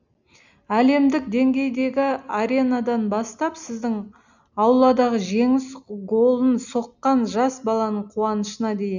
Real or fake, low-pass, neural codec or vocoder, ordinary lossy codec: real; 7.2 kHz; none; none